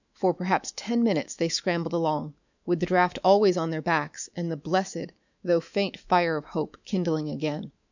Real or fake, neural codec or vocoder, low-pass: fake; autoencoder, 48 kHz, 128 numbers a frame, DAC-VAE, trained on Japanese speech; 7.2 kHz